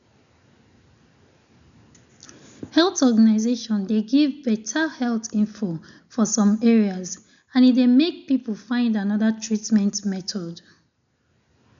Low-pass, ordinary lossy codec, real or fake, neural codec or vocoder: 7.2 kHz; none; real; none